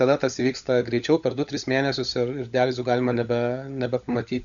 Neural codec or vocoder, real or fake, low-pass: codec, 16 kHz, 16 kbps, FunCodec, trained on LibriTTS, 50 frames a second; fake; 7.2 kHz